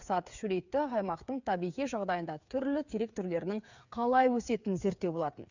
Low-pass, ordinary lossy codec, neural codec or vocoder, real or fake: 7.2 kHz; none; codec, 16 kHz, 8 kbps, FreqCodec, smaller model; fake